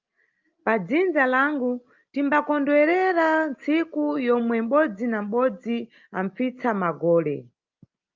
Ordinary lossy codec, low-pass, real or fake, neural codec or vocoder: Opus, 24 kbps; 7.2 kHz; real; none